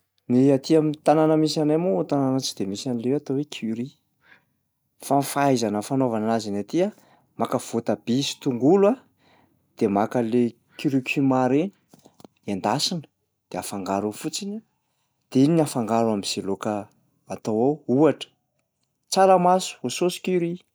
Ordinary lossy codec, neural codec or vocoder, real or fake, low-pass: none; none; real; none